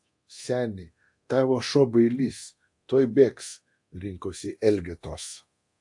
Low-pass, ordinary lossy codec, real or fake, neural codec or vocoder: 10.8 kHz; AAC, 64 kbps; fake; codec, 24 kHz, 0.9 kbps, DualCodec